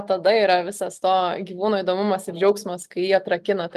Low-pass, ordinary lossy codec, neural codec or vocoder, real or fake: 14.4 kHz; Opus, 32 kbps; none; real